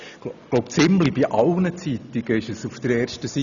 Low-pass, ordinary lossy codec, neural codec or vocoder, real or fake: 7.2 kHz; none; none; real